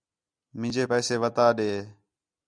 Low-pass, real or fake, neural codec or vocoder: 9.9 kHz; real; none